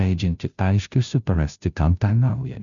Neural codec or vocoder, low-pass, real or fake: codec, 16 kHz, 0.5 kbps, FunCodec, trained on Chinese and English, 25 frames a second; 7.2 kHz; fake